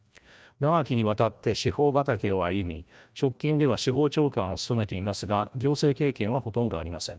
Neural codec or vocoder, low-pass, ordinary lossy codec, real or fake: codec, 16 kHz, 1 kbps, FreqCodec, larger model; none; none; fake